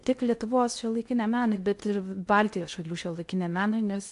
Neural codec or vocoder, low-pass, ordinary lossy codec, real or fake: codec, 16 kHz in and 24 kHz out, 0.6 kbps, FocalCodec, streaming, 2048 codes; 10.8 kHz; AAC, 64 kbps; fake